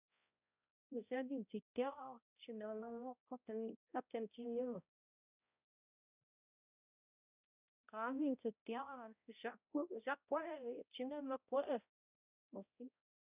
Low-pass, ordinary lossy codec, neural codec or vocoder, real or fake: 3.6 kHz; none; codec, 16 kHz, 0.5 kbps, X-Codec, HuBERT features, trained on balanced general audio; fake